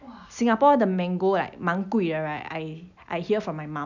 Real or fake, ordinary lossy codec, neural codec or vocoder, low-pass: real; none; none; 7.2 kHz